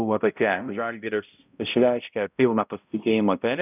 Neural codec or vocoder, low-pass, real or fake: codec, 16 kHz, 0.5 kbps, X-Codec, HuBERT features, trained on balanced general audio; 3.6 kHz; fake